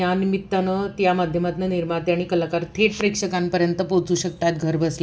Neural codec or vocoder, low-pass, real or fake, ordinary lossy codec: none; none; real; none